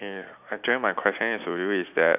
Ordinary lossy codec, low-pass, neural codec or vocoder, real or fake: none; 3.6 kHz; none; real